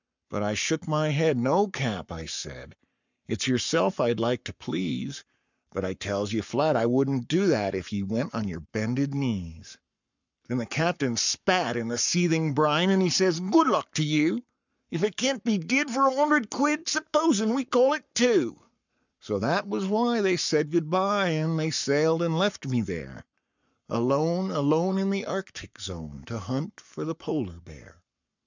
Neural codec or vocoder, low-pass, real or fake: codec, 44.1 kHz, 7.8 kbps, Pupu-Codec; 7.2 kHz; fake